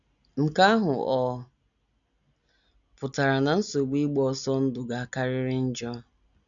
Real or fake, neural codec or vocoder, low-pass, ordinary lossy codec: real; none; 7.2 kHz; none